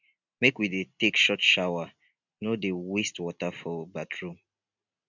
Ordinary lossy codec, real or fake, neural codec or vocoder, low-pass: none; real; none; 7.2 kHz